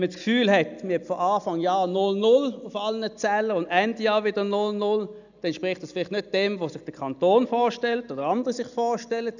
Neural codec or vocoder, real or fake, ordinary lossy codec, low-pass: none; real; none; 7.2 kHz